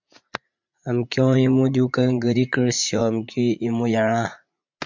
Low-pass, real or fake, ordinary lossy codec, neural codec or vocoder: 7.2 kHz; fake; MP3, 64 kbps; vocoder, 44.1 kHz, 80 mel bands, Vocos